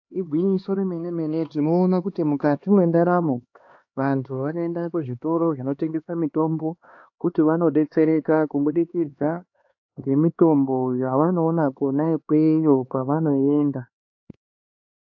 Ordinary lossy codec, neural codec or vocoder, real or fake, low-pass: AAC, 48 kbps; codec, 16 kHz, 2 kbps, X-Codec, HuBERT features, trained on LibriSpeech; fake; 7.2 kHz